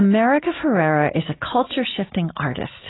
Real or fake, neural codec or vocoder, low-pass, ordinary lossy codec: real; none; 7.2 kHz; AAC, 16 kbps